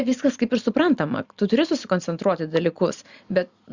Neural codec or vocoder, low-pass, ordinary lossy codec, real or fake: none; 7.2 kHz; Opus, 64 kbps; real